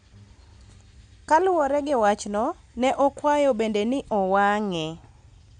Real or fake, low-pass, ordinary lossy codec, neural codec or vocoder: real; 9.9 kHz; MP3, 96 kbps; none